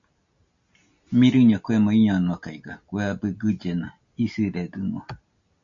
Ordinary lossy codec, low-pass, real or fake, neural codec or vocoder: MP3, 64 kbps; 7.2 kHz; real; none